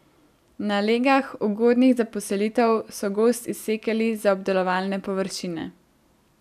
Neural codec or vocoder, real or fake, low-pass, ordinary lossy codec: none; real; 14.4 kHz; none